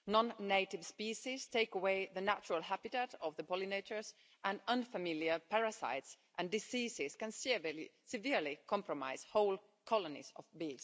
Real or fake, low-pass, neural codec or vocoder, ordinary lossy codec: real; none; none; none